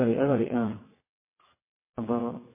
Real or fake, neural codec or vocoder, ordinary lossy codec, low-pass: fake; vocoder, 22.05 kHz, 80 mel bands, WaveNeXt; MP3, 16 kbps; 3.6 kHz